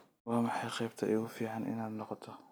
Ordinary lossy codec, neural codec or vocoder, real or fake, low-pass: none; none; real; none